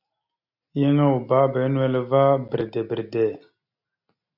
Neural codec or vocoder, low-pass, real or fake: none; 5.4 kHz; real